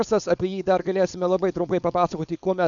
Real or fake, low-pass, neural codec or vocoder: fake; 7.2 kHz; codec, 16 kHz, 4.8 kbps, FACodec